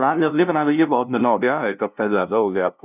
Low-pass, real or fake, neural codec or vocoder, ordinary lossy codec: 3.6 kHz; fake; codec, 16 kHz, 0.5 kbps, FunCodec, trained on LibriTTS, 25 frames a second; none